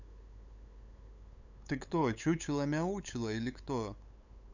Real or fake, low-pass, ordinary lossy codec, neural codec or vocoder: fake; 7.2 kHz; none; codec, 16 kHz, 8 kbps, FunCodec, trained on LibriTTS, 25 frames a second